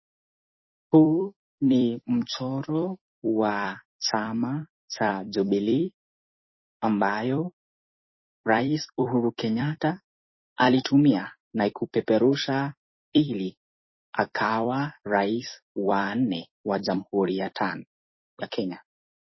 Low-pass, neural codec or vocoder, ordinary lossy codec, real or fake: 7.2 kHz; vocoder, 44.1 kHz, 128 mel bands every 256 samples, BigVGAN v2; MP3, 24 kbps; fake